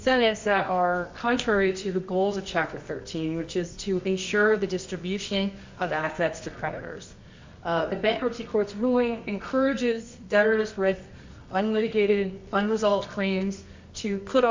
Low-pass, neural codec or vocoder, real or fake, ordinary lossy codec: 7.2 kHz; codec, 24 kHz, 0.9 kbps, WavTokenizer, medium music audio release; fake; MP3, 64 kbps